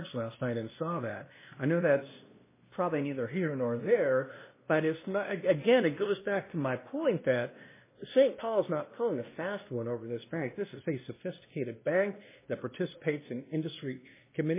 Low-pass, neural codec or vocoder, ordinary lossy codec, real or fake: 3.6 kHz; codec, 16 kHz, 1 kbps, X-Codec, WavLM features, trained on Multilingual LibriSpeech; MP3, 16 kbps; fake